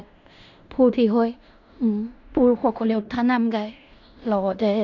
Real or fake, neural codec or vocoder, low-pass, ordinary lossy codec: fake; codec, 16 kHz in and 24 kHz out, 0.9 kbps, LongCat-Audio-Codec, fine tuned four codebook decoder; 7.2 kHz; none